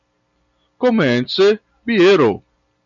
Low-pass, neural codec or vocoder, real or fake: 7.2 kHz; none; real